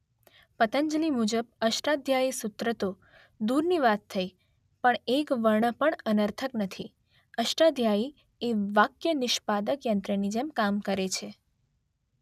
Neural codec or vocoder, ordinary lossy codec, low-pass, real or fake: none; none; 14.4 kHz; real